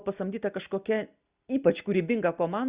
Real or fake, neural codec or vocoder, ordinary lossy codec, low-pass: real; none; Opus, 64 kbps; 3.6 kHz